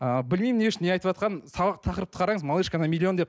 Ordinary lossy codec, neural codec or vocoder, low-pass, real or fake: none; none; none; real